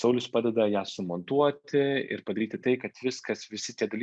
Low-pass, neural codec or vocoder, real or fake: 9.9 kHz; none; real